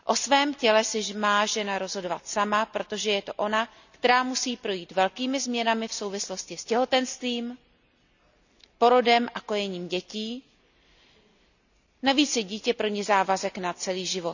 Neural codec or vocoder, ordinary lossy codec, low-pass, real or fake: none; none; 7.2 kHz; real